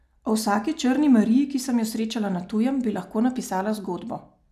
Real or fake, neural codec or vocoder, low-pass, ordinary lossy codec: real; none; 14.4 kHz; none